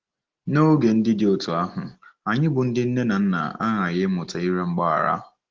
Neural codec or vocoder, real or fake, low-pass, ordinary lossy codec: none; real; 7.2 kHz; Opus, 16 kbps